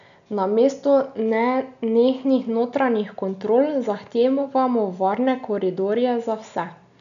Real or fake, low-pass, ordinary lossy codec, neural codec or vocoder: real; 7.2 kHz; none; none